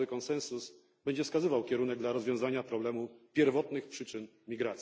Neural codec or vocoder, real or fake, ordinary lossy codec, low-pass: none; real; none; none